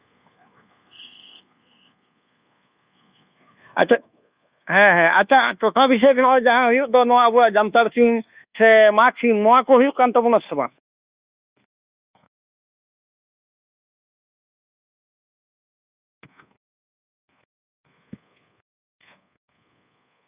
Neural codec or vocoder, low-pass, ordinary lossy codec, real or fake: codec, 24 kHz, 1.2 kbps, DualCodec; 3.6 kHz; Opus, 64 kbps; fake